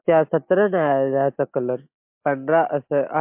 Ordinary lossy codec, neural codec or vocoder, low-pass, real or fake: MP3, 32 kbps; none; 3.6 kHz; real